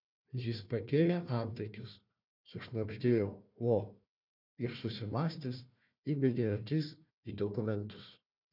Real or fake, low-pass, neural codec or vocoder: fake; 5.4 kHz; codec, 16 kHz, 1 kbps, FunCodec, trained on Chinese and English, 50 frames a second